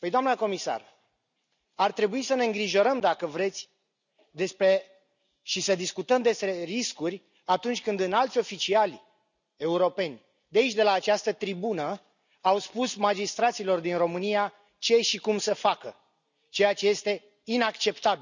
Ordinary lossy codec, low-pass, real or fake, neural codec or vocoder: none; 7.2 kHz; real; none